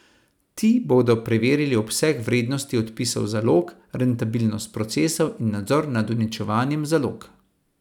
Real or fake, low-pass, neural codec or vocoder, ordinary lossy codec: real; 19.8 kHz; none; none